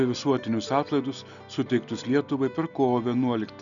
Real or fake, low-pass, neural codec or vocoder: real; 7.2 kHz; none